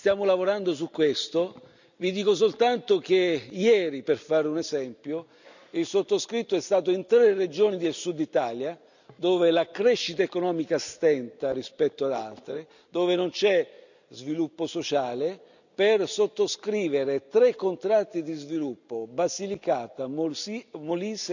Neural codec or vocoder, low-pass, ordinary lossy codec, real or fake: none; 7.2 kHz; none; real